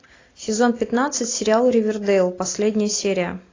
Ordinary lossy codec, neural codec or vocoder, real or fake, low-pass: AAC, 32 kbps; none; real; 7.2 kHz